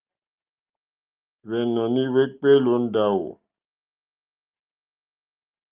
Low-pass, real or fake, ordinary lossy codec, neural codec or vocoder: 3.6 kHz; real; Opus, 32 kbps; none